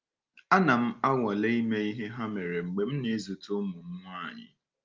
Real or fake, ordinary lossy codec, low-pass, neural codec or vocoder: real; Opus, 24 kbps; 7.2 kHz; none